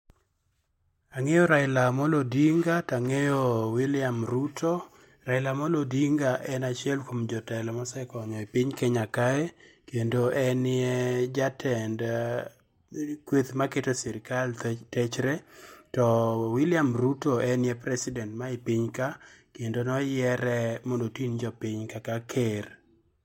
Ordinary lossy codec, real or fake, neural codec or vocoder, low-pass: MP3, 64 kbps; real; none; 19.8 kHz